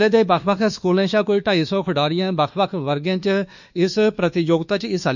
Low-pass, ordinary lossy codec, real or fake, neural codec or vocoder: 7.2 kHz; none; fake; codec, 24 kHz, 1.2 kbps, DualCodec